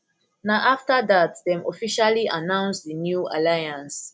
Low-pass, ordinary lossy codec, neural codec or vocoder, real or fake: none; none; none; real